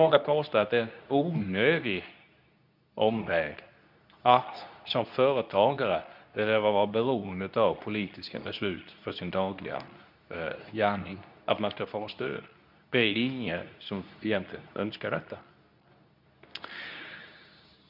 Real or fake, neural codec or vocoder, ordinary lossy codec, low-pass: fake; codec, 24 kHz, 0.9 kbps, WavTokenizer, medium speech release version 2; none; 5.4 kHz